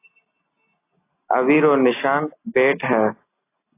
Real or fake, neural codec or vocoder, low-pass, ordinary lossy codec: real; none; 3.6 kHz; AAC, 24 kbps